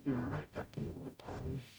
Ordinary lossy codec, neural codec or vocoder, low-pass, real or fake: none; codec, 44.1 kHz, 0.9 kbps, DAC; none; fake